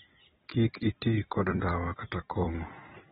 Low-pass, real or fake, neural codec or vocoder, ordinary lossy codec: 19.8 kHz; fake; vocoder, 44.1 kHz, 128 mel bands every 256 samples, BigVGAN v2; AAC, 16 kbps